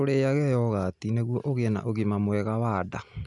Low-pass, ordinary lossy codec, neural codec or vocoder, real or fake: 10.8 kHz; none; none; real